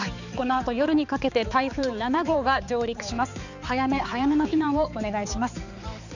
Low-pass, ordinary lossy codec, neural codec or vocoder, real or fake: 7.2 kHz; none; codec, 16 kHz, 4 kbps, X-Codec, HuBERT features, trained on balanced general audio; fake